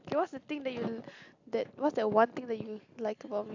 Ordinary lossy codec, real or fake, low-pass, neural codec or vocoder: AAC, 48 kbps; real; 7.2 kHz; none